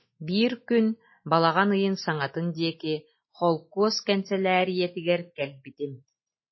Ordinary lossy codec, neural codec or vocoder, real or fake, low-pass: MP3, 24 kbps; none; real; 7.2 kHz